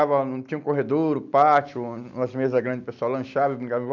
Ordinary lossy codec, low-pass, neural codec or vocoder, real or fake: none; 7.2 kHz; none; real